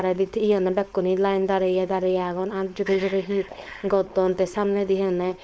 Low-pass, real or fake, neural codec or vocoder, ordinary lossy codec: none; fake; codec, 16 kHz, 4.8 kbps, FACodec; none